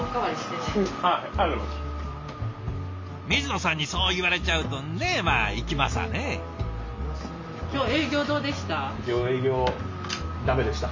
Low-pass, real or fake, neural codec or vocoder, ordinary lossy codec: 7.2 kHz; real; none; MP3, 48 kbps